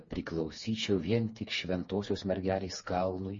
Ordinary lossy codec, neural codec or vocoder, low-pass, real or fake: MP3, 32 kbps; codec, 16 kHz, 4 kbps, FreqCodec, smaller model; 7.2 kHz; fake